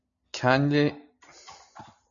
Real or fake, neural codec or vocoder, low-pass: real; none; 7.2 kHz